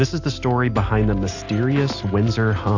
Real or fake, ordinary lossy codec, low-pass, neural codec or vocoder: real; AAC, 48 kbps; 7.2 kHz; none